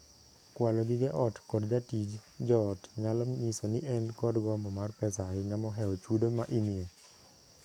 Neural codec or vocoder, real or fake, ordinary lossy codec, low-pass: codec, 44.1 kHz, 7.8 kbps, DAC; fake; none; 19.8 kHz